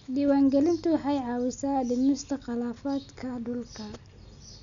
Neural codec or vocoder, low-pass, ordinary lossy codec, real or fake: none; 7.2 kHz; none; real